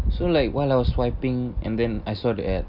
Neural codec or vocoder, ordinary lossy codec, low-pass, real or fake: none; none; 5.4 kHz; real